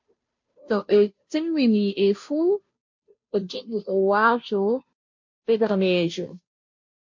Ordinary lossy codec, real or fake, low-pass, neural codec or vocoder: MP3, 48 kbps; fake; 7.2 kHz; codec, 16 kHz, 0.5 kbps, FunCodec, trained on Chinese and English, 25 frames a second